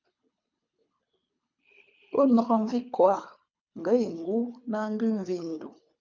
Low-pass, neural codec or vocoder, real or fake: 7.2 kHz; codec, 24 kHz, 3 kbps, HILCodec; fake